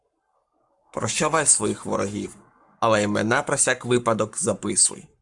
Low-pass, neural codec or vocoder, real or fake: 10.8 kHz; codec, 44.1 kHz, 7.8 kbps, Pupu-Codec; fake